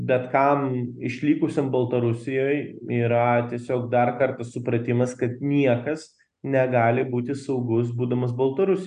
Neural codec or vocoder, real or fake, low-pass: none; real; 9.9 kHz